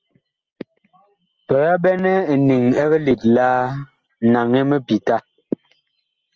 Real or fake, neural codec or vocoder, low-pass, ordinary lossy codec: real; none; 7.2 kHz; Opus, 24 kbps